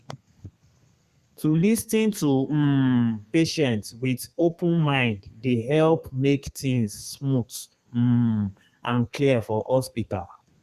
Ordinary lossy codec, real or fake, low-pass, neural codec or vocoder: Opus, 64 kbps; fake; 14.4 kHz; codec, 32 kHz, 1.9 kbps, SNAC